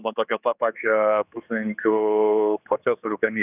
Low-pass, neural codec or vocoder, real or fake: 3.6 kHz; codec, 16 kHz, 2 kbps, X-Codec, HuBERT features, trained on general audio; fake